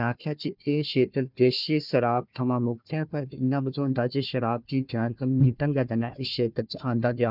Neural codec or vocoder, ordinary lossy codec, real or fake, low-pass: codec, 16 kHz, 1 kbps, FunCodec, trained on Chinese and English, 50 frames a second; none; fake; 5.4 kHz